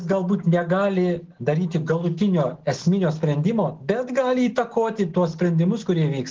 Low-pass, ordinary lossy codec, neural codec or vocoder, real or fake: 7.2 kHz; Opus, 16 kbps; none; real